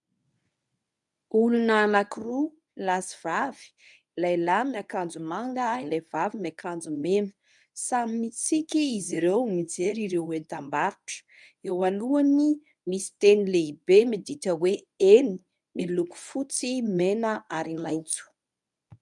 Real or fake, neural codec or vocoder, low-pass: fake; codec, 24 kHz, 0.9 kbps, WavTokenizer, medium speech release version 1; 10.8 kHz